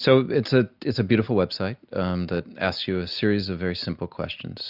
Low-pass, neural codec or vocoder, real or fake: 5.4 kHz; none; real